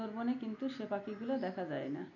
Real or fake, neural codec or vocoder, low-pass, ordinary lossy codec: real; none; 7.2 kHz; none